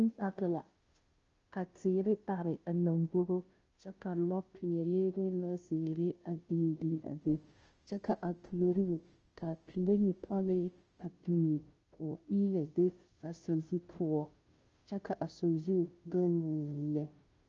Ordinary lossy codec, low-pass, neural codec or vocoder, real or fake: Opus, 24 kbps; 7.2 kHz; codec, 16 kHz, 0.5 kbps, FunCodec, trained on Chinese and English, 25 frames a second; fake